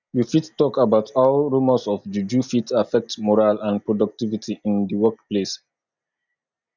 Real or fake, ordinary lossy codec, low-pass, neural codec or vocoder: real; none; 7.2 kHz; none